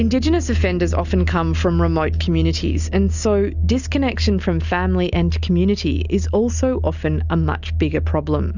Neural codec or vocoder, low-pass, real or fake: none; 7.2 kHz; real